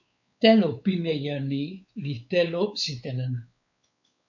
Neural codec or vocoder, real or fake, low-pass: codec, 16 kHz, 4 kbps, X-Codec, WavLM features, trained on Multilingual LibriSpeech; fake; 7.2 kHz